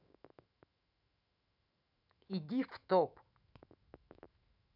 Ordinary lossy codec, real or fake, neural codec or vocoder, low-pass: none; fake; autoencoder, 48 kHz, 128 numbers a frame, DAC-VAE, trained on Japanese speech; 5.4 kHz